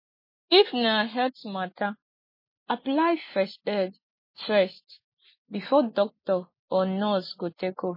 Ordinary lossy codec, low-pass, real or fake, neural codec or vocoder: MP3, 24 kbps; 5.4 kHz; real; none